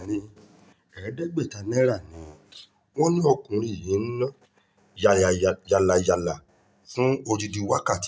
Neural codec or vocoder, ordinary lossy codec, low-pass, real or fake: none; none; none; real